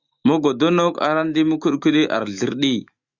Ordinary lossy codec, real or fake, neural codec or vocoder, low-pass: Opus, 64 kbps; fake; autoencoder, 48 kHz, 128 numbers a frame, DAC-VAE, trained on Japanese speech; 7.2 kHz